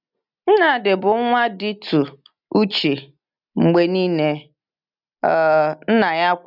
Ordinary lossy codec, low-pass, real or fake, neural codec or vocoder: none; 5.4 kHz; real; none